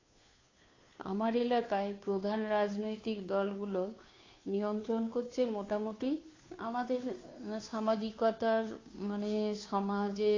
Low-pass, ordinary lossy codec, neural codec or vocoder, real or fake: 7.2 kHz; AAC, 32 kbps; codec, 16 kHz, 2 kbps, FunCodec, trained on Chinese and English, 25 frames a second; fake